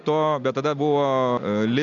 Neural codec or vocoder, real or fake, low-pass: none; real; 7.2 kHz